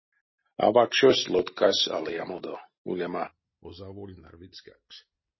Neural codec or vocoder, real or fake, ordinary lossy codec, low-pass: none; real; MP3, 24 kbps; 7.2 kHz